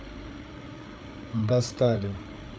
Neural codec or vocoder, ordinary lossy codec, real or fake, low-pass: codec, 16 kHz, 8 kbps, FreqCodec, larger model; none; fake; none